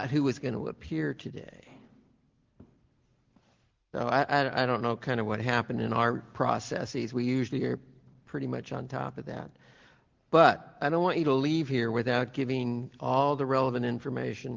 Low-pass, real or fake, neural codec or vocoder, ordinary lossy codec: 7.2 kHz; real; none; Opus, 16 kbps